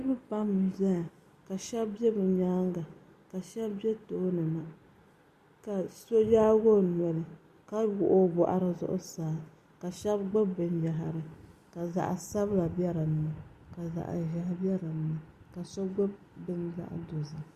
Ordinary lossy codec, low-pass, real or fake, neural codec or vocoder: Opus, 64 kbps; 14.4 kHz; real; none